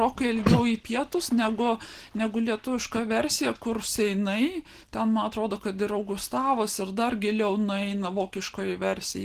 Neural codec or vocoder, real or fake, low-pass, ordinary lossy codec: none; real; 14.4 kHz; Opus, 16 kbps